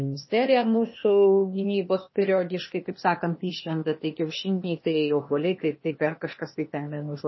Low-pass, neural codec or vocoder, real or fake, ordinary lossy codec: 7.2 kHz; codec, 16 kHz, 0.8 kbps, ZipCodec; fake; MP3, 24 kbps